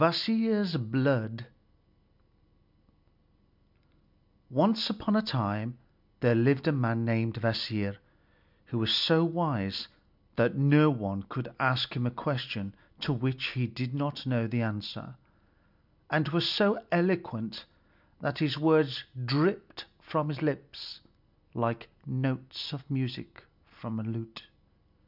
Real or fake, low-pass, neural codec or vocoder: real; 5.4 kHz; none